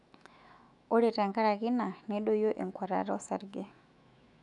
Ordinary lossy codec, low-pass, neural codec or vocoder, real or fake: none; 10.8 kHz; autoencoder, 48 kHz, 128 numbers a frame, DAC-VAE, trained on Japanese speech; fake